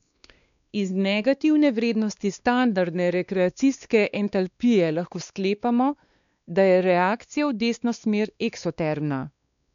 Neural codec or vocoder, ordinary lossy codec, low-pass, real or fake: codec, 16 kHz, 2 kbps, X-Codec, WavLM features, trained on Multilingual LibriSpeech; none; 7.2 kHz; fake